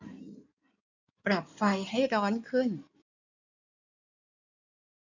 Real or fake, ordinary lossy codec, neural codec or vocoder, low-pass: fake; none; codec, 16 kHz in and 24 kHz out, 2.2 kbps, FireRedTTS-2 codec; 7.2 kHz